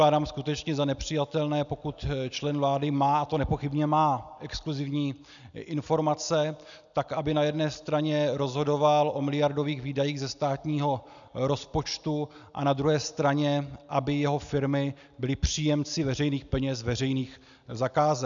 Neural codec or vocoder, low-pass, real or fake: none; 7.2 kHz; real